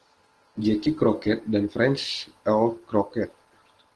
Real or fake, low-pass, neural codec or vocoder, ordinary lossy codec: real; 10.8 kHz; none; Opus, 16 kbps